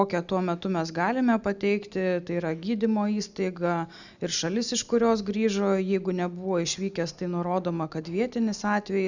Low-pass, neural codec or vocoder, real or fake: 7.2 kHz; none; real